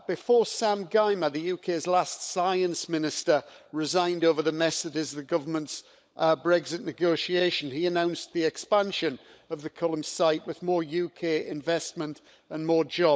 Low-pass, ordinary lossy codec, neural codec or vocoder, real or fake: none; none; codec, 16 kHz, 16 kbps, FunCodec, trained on LibriTTS, 50 frames a second; fake